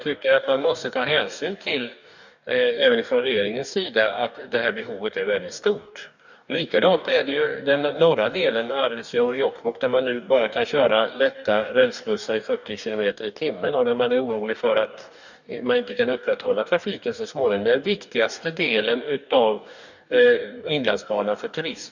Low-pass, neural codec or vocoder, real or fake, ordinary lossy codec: 7.2 kHz; codec, 44.1 kHz, 2.6 kbps, DAC; fake; none